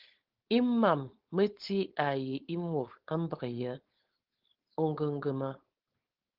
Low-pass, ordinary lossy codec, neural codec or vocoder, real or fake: 5.4 kHz; Opus, 16 kbps; codec, 16 kHz, 4.8 kbps, FACodec; fake